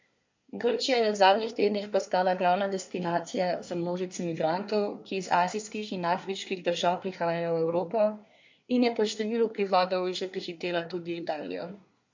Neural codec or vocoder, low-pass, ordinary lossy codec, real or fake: codec, 24 kHz, 1 kbps, SNAC; 7.2 kHz; MP3, 48 kbps; fake